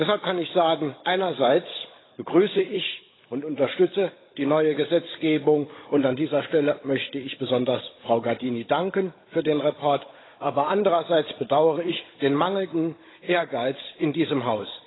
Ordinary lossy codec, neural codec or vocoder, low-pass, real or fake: AAC, 16 kbps; codec, 16 kHz, 16 kbps, FunCodec, trained on Chinese and English, 50 frames a second; 7.2 kHz; fake